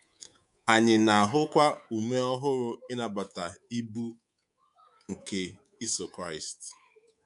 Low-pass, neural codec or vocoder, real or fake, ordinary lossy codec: 10.8 kHz; codec, 24 kHz, 3.1 kbps, DualCodec; fake; none